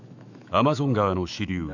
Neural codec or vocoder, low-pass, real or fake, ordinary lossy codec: autoencoder, 48 kHz, 128 numbers a frame, DAC-VAE, trained on Japanese speech; 7.2 kHz; fake; none